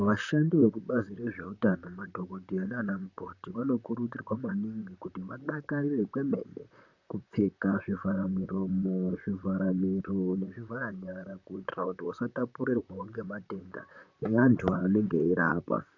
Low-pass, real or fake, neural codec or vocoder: 7.2 kHz; fake; vocoder, 44.1 kHz, 128 mel bands, Pupu-Vocoder